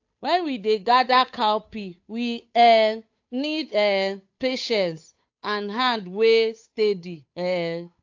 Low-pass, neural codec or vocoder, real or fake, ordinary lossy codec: 7.2 kHz; codec, 16 kHz, 8 kbps, FunCodec, trained on Chinese and English, 25 frames a second; fake; AAC, 48 kbps